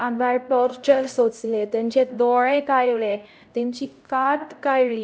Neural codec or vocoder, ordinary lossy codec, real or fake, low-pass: codec, 16 kHz, 0.5 kbps, X-Codec, HuBERT features, trained on LibriSpeech; none; fake; none